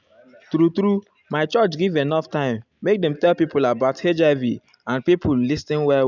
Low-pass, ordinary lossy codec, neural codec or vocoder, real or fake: 7.2 kHz; none; none; real